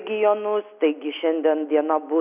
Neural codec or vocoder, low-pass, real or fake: none; 3.6 kHz; real